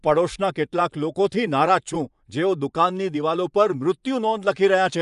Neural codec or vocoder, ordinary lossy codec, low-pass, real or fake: vocoder, 24 kHz, 100 mel bands, Vocos; none; 10.8 kHz; fake